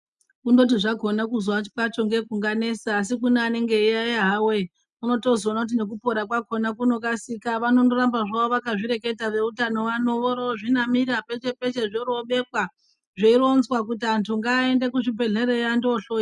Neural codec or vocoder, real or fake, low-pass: none; real; 10.8 kHz